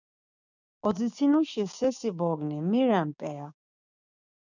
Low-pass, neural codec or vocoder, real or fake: 7.2 kHz; codec, 16 kHz, 6 kbps, DAC; fake